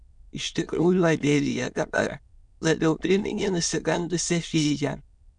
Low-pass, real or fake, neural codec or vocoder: 9.9 kHz; fake; autoencoder, 22.05 kHz, a latent of 192 numbers a frame, VITS, trained on many speakers